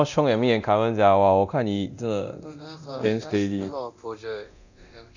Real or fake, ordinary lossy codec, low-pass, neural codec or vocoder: fake; none; 7.2 kHz; codec, 24 kHz, 0.9 kbps, DualCodec